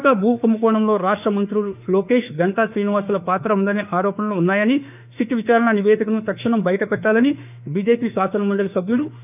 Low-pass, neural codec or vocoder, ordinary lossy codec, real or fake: 3.6 kHz; autoencoder, 48 kHz, 32 numbers a frame, DAC-VAE, trained on Japanese speech; none; fake